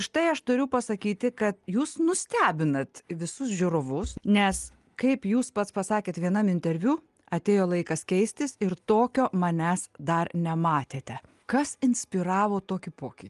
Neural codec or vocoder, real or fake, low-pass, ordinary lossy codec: none; real; 10.8 kHz; Opus, 32 kbps